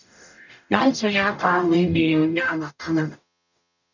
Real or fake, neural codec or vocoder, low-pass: fake; codec, 44.1 kHz, 0.9 kbps, DAC; 7.2 kHz